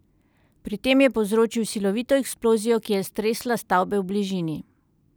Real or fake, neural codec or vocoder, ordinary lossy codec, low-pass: real; none; none; none